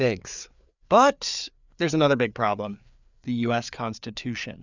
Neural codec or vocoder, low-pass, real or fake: codec, 16 kHz, 4 kbps, FreqCodec, larger model; 7.2 kHz; fake